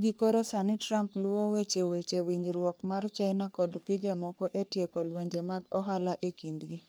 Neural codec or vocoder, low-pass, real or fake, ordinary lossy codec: codec, 44.1 kHz, 3.4 kbps, Pupu-Codec; none; fake; none